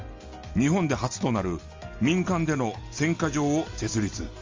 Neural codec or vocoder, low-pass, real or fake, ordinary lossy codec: none; 7.2 kHz; real; Opus, 32 kbps